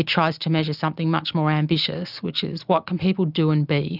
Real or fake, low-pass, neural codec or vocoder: real; 5.4 kHz; none